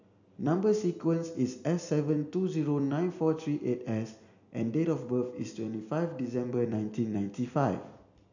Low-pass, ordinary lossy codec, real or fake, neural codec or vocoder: 7.2 kHz; none; real; none